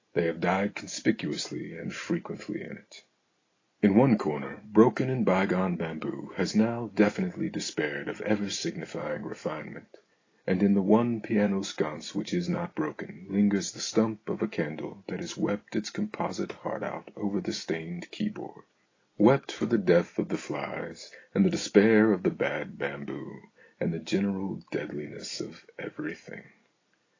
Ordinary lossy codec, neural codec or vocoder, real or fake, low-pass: AAC, 32 kbps; none; real; 7.2 kHz